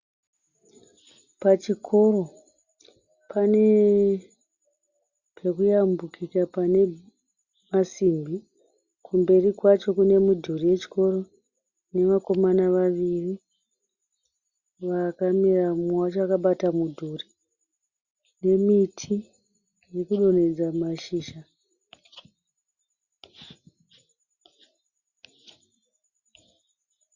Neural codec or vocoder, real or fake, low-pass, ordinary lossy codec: none; real; 7.2 kHz; Opus, 64 kbps